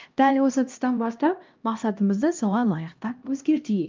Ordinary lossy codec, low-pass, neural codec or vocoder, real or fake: Opus, 24 kbps; 7.2 kHz; codec, 16 kHz, 1 kbps, X-Codec, HuBERT features, trained on LibriSpeech; fake